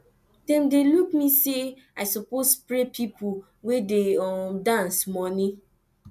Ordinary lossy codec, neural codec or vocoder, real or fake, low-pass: MP3, 96 kbps; none; real; 14.4 kHz